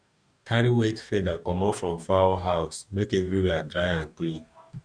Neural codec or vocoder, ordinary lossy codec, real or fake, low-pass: codec, 44.1 kHz, 2.6 kbps, DAC; none; fake; 9.9 kHz